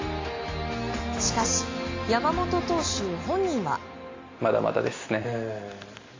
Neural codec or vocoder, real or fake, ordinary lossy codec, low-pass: none; real; AAC, 32 kbps; 7.2 kHz